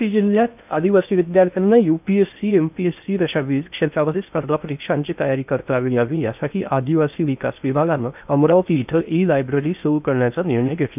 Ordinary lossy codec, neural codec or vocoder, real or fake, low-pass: none; codec, 16 kHz in and 24 kHz out, 0.6 kbps, FocalCodec, streaming, 2048 codes; fake; 3.6 kHz